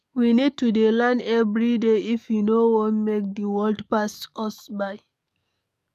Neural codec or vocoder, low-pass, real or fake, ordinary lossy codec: codec, 44.1 kHz, 7.8 kbps, DAC; 14.4 kHz; fake; none